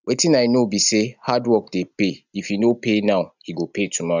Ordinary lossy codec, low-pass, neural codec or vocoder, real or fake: none; 7.2 kHz; none; real